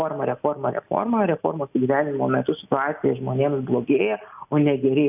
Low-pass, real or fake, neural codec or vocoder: 3.6 kHz; real; none